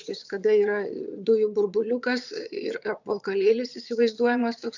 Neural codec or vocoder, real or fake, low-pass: vocoder, 22.05 kHz, 80 mel bands, Vocos; fake; 7.2 kHz